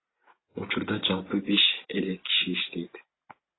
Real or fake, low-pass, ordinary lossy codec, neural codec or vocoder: real; 7.2 kHz; AAC, 16 kbps; none